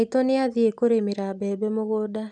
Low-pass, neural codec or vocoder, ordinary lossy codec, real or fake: 10.8 kHz; none; none; real